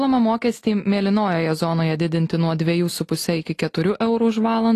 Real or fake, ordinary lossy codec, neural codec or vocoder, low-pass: real; AAC, 48 kbps; none; 14.4 kHz